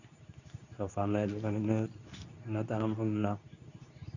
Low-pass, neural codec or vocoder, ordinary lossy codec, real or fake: 7.2 kHz; codec, 24 kHz, 0.9 kbps, WavTokenizer, medium speech release version 2; none; fake